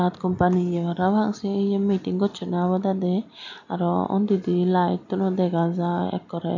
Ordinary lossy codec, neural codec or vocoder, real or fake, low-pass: none; none; real; 7.2 kHz